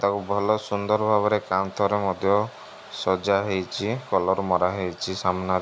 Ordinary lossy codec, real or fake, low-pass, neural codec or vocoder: none; real; none; none